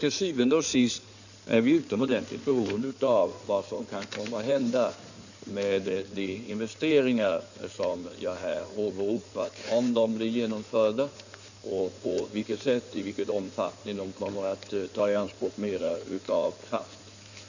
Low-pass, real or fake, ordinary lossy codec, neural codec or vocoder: 7.2 kHz; fake; none; codec, 16 kHz in and 24 kHz out, 2.2 kbps, FireRedTTS-2 codec